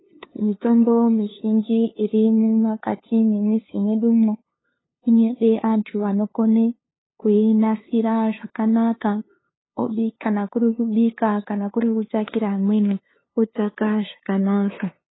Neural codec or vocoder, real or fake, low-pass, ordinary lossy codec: codec, 16 kHz, 2 kbps, FunCodec, trained on LibriTTS, 25 frames a second; fake; 7.2 kHz; AAC, 16 kbps